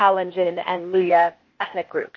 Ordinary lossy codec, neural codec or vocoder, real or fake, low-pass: MP3, 32 kbps; codec, 16 kHz, 0.8 kbps, ZipCodec; fake; 7.2 kHz